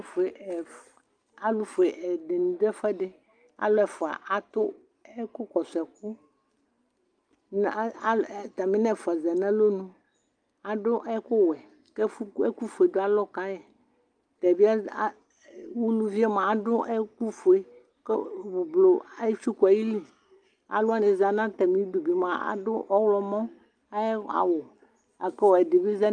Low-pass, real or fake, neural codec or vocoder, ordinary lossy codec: 9.9 kHz; real; none; Opus, 32 kbps